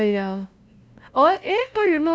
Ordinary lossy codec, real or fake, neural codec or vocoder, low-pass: none; fake; codec, 16 kHz, 0.5 kbps, FunCodec, trained on LibriTTS, 25 frames a second; none